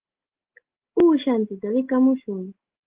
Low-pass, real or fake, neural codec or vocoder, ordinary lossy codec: 3.6 kHz; real; none; Opus, 16 kbps